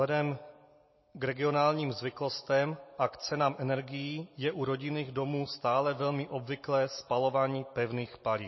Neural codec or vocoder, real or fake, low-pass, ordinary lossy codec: none; real; 7.2 kHz; MP3, 24 kbps